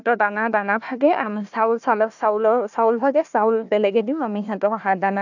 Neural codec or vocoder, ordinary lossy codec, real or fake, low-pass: codec, 16 kHz, 1 kbps, FunCodec, trained on Chinese and English, 50 frames a second; none; fake; 7.2 kHz